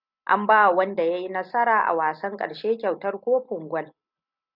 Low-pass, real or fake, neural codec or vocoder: 5.4 kHz; real; none